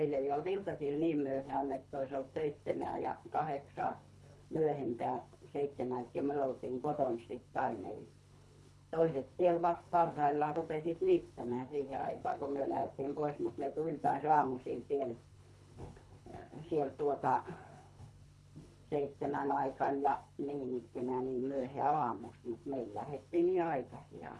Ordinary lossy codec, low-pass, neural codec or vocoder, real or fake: none; none; codec, 24 kHz, 3 kbps, HILCodec; fake